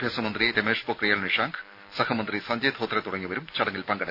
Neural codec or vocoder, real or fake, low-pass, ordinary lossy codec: none; real; 5.4 kHz; none